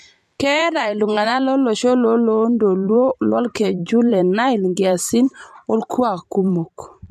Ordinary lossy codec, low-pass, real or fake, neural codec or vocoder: MP3, 64 kbps; 14.4 kHz; fake; vocoder, 44.1 kHz, 128 mel bands every 512 samples, BigVGAN v2